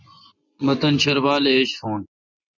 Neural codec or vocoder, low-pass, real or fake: vocoder, 24 kHz, 100 mel bands, Vocos; 7.2 kHz; fake